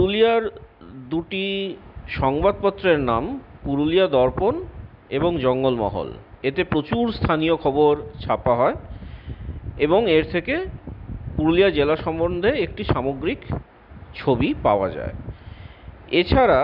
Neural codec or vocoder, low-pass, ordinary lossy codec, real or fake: none; 5.4 kHz; none; real